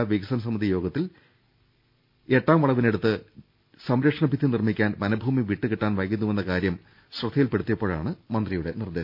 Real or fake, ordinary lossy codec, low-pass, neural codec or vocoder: real; MP3, 48 kbps; 5.4 kHz; none